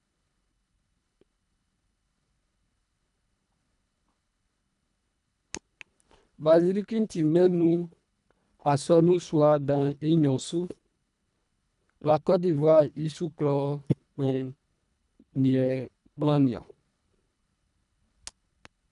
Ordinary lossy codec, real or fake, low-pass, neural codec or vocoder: none; fake; 10.8 kHz; codec, 24 kHz, 1.5 kbps, HILCodec